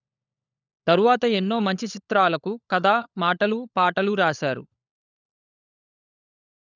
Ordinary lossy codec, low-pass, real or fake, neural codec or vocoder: none; 7.2 kHz; fake; codec, 16 kHz, 16 kbps, FunCodec, trained on LibriTTS, 50 frames a second